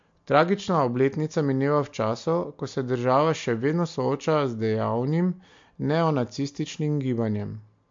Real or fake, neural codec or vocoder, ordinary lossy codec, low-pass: real; none; MP3, 48 kbps; 7.2 kHz